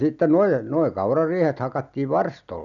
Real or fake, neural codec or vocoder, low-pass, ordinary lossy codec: real; none; 7.2 kHz; none